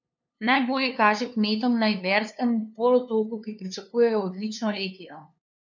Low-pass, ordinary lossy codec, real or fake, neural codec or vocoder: 7.2 kHz; none; fake; codec, 16 kHz, 2 kbps, FunCodec, trained on LibriTTS, 25 frames a second